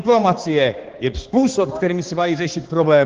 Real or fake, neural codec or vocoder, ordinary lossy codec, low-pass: fake; codec, 16 kHz, 2 kbps, X-Codec, HuBERT features, trained on balanced general audio; Opus, 16 kbps; 7.2 kHz